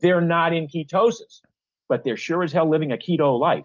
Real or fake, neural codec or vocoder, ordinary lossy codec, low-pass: real; none; Opus, 32 kbps; 7.2 kHz